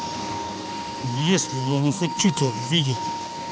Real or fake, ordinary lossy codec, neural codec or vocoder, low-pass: fake; none; codec, 16 kHz, 2 kbps, X-Codec, HuBERT features, trained on balanced general audio; none